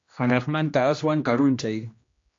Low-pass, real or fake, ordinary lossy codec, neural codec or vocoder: 7.2 kHz; fake; AAC, 48 kbps; codec, 16 kHz, 1 kbps, X-Codec, HuBERT features, trained on general audio